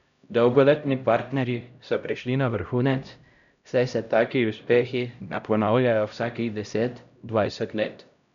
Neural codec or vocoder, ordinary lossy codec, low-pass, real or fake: codec, 16 kHz, 0.5 kbps, X-Codec, HuBERT features, trained on LibriSpeech; none; 7.2 kHz; fake